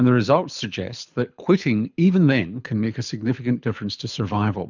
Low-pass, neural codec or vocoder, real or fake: 7.2 kHz; codec, 24 kHz, 6 kbps, HILCodec; fake